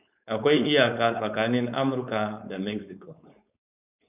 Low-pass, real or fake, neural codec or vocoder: 3.6 kHz; fake; codec, 16 kHz, 4.8 kbps, FACodec